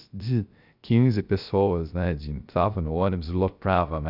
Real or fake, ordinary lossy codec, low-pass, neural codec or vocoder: fake; none; 5.4 kHz; codec, 16 kHz, 0.3 kbps, FocalCodec